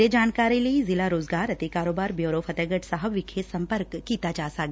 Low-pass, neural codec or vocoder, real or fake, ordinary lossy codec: none; none; real; none